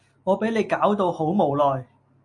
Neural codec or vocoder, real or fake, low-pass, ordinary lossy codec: none; real; 10.8 kHz; MP3, 48 kbps